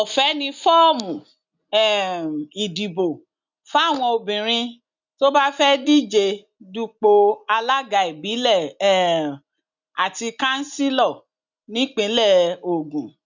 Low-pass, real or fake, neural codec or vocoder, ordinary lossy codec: 7.2 kHz; real; none; none